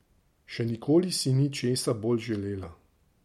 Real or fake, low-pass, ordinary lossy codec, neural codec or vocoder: real; 19.8 kHz; MP3, 64 kbps; none